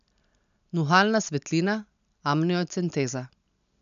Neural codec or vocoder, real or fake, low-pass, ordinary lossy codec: none; real; 7.2 kHz; none